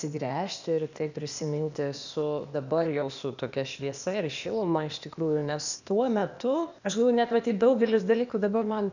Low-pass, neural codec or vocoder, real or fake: 7.2 kHz; codec, 16 kHz, 0.8 kbps, ZipCodec; fake